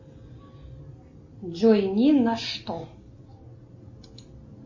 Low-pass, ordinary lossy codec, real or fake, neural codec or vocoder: 7.2 kHz; MP3, 32 kbps; real; none